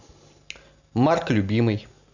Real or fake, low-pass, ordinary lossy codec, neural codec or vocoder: real; 7.2 kHz; none; none